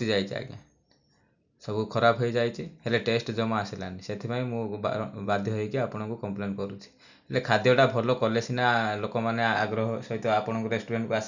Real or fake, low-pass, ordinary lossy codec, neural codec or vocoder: real; 7.2 kHz; none; none